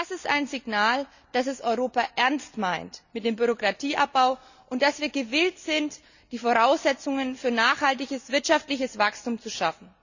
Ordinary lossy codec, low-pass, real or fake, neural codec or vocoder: none; 7.2 kHz; real; none